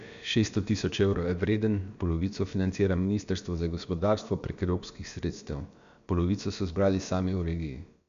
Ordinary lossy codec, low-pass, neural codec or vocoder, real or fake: MP3, 64 kbps; 7.2 kHz; codec, 16 kHz, about 1 kbps, DyCAST, with the encoder's durations; fake